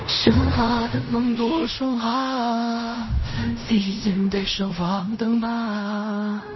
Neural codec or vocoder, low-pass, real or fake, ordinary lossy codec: codec, 16 kHz in and 24 kHz out, 0.4 kbps, LongCat-Audio-Codec, fine tuned four codebook decoder; 7.2 kHz; fake; MP3, 24 kbps